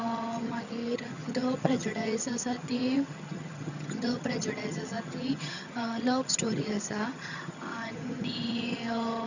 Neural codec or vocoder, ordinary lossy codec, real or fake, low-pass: vocoder, 22.05 kHz, 80 mel bands, HiFi-GAN; none; fake; 7.2 kHz